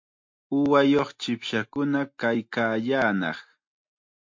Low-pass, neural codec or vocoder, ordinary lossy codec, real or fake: 7.2 kHz; none; MP3, 48 kbps; real